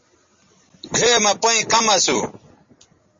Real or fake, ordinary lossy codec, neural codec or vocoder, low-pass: real; MP3, 32 kbps; none; 7.2 kHz